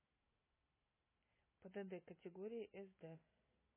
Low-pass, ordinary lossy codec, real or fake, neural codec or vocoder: 3.6 kHz; MP3, 16 kbps; real; none